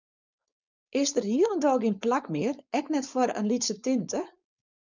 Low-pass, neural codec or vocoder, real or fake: 7.2 kHz; codec, 16 kHz, 4.8 kbps, FACodec; fake